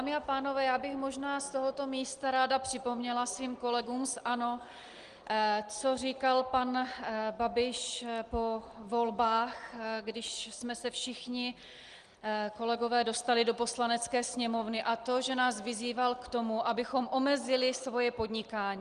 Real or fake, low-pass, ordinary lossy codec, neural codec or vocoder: real; 9.9 kHz; Opus, 24 kbps; none